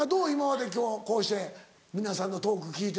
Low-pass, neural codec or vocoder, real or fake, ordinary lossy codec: none; none; real; none